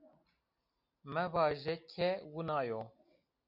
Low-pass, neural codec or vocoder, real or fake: 5.4 kHz; none; real